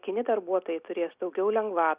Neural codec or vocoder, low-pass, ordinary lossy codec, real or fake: none; 3.6 kHz; Opus, 64 kbps; real